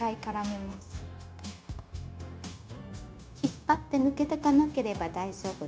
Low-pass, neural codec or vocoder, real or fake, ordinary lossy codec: none; codec, 16 kHz, 0.9 kbps, LongCat-Audio-Codec; fake; none